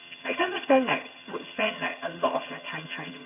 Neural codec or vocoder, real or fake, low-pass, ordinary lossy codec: vocoder, 22.05 kHz, 80 mel bands, HiFi-GAN; fake; 3.6 kHz; none